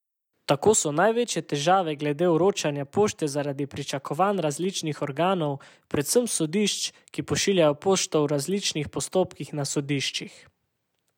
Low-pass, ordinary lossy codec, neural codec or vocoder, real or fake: 19.8 kHz; none; none; real